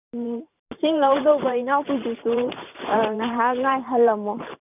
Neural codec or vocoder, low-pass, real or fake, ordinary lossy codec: vocoder, 44.1 kHz, 128 mel bands every 512 samples, BigVGAN v2; 3.6 kHz; fake; none